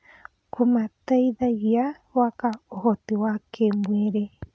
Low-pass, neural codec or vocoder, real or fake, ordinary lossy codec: none; none; real; none